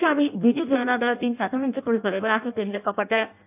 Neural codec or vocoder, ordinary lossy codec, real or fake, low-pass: codec, 16 kHz in and 24 kHz out, 0.6 kbps, FireRedTTS-2 codec; none; fake; 3.6 kHz